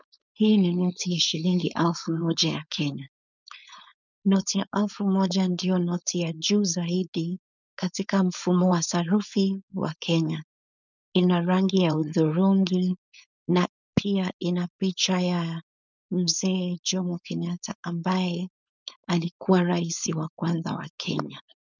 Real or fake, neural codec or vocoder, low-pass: fake; codec, 16 kHz, 4.8 kbps, FACodec; 7.2 kHz